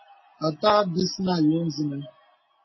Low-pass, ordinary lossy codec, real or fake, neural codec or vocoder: 7.2 kHz; MP3, 24 kbps; real; none